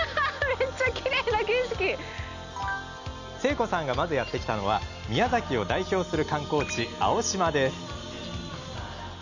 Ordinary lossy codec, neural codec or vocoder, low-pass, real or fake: none; none; 7.2 kHz; real